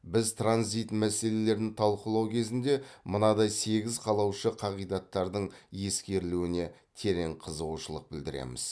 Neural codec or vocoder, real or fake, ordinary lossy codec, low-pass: none; real; none; none